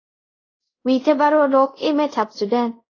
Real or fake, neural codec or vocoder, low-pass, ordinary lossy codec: fake; codec, 24 kHz, 0.5 kbps, DualCodec; 7.2 kHz; AAC, 32 kbps